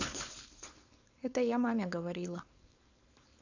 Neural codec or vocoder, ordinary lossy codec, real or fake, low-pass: codec, 16 kHz, 4.8 kbps, FACodec; AAC, 48 kbps; fake; 7.2 kHz